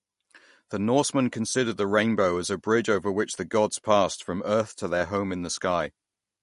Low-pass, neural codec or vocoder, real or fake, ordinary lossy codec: 14.4 kHz; none; real; MP3, 48 kbps